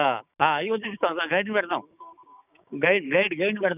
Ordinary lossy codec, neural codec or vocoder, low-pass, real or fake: none; codec, 16 kHz, 6 kbps, DAC; 3.6 kHz; fake